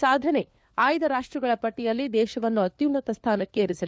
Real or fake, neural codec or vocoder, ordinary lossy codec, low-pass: fake; codec, 16 kHz, 4 kbps, FunCodec, trained on LibriTTS, 50 frames a second; none; none